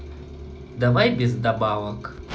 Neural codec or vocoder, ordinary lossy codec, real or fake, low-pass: none; none; real; none